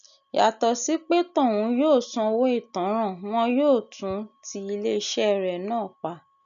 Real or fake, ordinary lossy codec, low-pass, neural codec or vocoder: real; none; 7.2 kHz; none